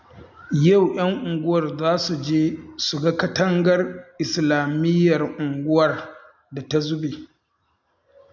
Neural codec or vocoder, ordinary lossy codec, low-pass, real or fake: none; none; 7.2 kHz; real